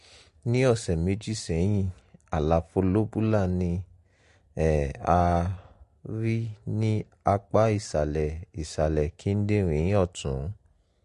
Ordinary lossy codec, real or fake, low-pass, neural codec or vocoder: MP3, 48 kbps; real; 10.8 kHz; none